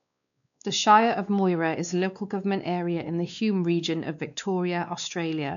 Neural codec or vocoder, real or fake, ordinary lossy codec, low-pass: codec, 16 kHz, 2 kbps, X-Codec, WavLM features, trained on Multilingual LibriSpeech; fake; none; 7.2 kHz